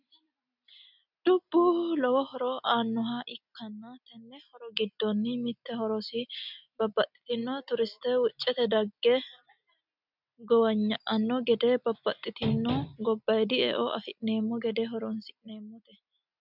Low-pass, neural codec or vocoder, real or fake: 5.4 kHz; none; real